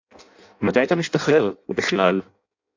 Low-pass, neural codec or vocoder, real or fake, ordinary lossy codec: 7.2 kHz; codec, 16 kHz in and 24 kHz out, 0.6 kbps, FireRedTTS-2 codec; fake; AAC, 48 kbps